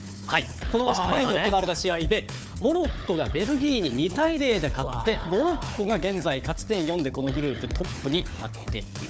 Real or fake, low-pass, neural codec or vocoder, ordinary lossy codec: fake; none; codec, 16 kHz, 4 kbps, FunCodec, trained on Chinese and English, 50 frames a second; none